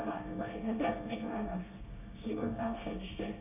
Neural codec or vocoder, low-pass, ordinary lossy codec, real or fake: codec, 24 kHz, 1 kbps, SNAC; 3.6 kHz; none; fake